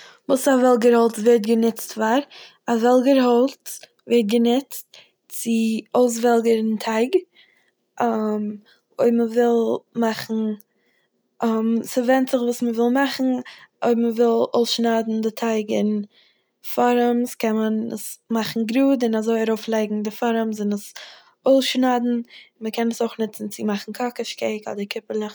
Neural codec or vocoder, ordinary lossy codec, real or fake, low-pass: none; none; real; none